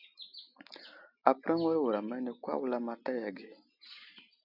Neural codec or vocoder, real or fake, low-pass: none; real; 5.4 kHz